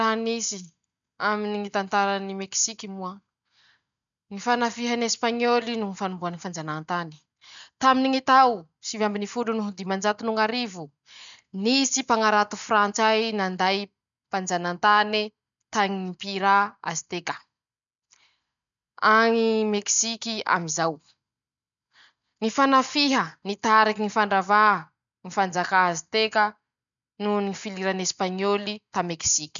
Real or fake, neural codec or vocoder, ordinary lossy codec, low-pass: real; none; none; 7.2 kHz